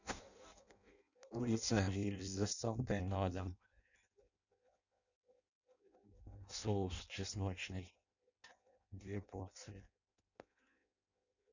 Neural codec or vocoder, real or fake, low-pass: codec, 16 kHz in and 24 kHz out, 0.6 kbps, FireRedTTS-2 codec; fake; 7.2 kHz